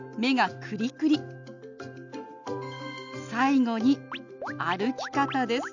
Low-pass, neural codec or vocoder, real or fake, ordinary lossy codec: 7.2 kHz; none; real; none